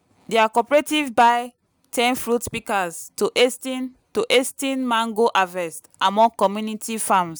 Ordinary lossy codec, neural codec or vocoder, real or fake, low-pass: none; none; real; none